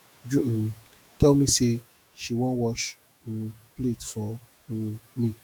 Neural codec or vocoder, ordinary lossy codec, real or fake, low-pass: autoencoder, 48 kHz, 128 numbers a frame, DAC-VAE, trained on Japanese speech; none; fake; none